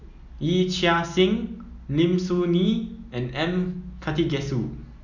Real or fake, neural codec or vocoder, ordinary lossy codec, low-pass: real; none; none; 7.2 kHz